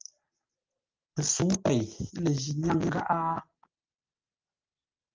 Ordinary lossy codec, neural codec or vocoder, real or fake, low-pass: Opus, 24 kbps; none; real; 7.2 kHz